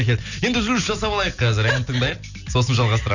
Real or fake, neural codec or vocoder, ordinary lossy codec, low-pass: real; none; none; 7.2 kHz